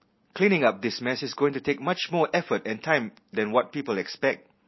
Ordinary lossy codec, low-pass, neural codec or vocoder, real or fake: MP3, 24 kbps; 7.2 kHz; none; real